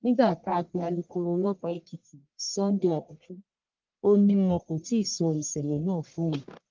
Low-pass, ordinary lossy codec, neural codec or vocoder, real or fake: 7.2 kHz; Opus, 32 kbps; codec, 44.1 kHz, 1.7 kbps, Pupu-Codec; fake